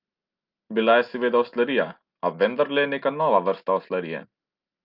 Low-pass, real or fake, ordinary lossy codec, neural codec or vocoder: 5.4 kHz; real; Opus, 32 kbps; none